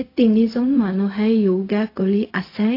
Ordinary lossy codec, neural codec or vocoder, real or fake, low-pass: AAC, 32 kbps; codec, 16 kHz, 0.4 kbps, LongCat-Audio-Codec; fake; 5.4 kHz